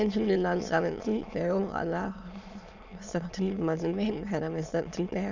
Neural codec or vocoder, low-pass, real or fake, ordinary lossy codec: autoencoder, 22.05 kHz, a latent of 192 numbers a frame, VITS, trained on many speakers; 7.2 kHz; fake; Opus, 64 kbps